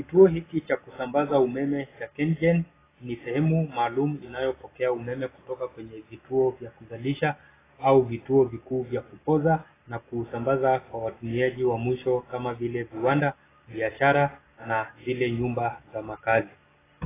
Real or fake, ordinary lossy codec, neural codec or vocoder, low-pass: real; AAC, 16 kbps; none; 3.6 kHz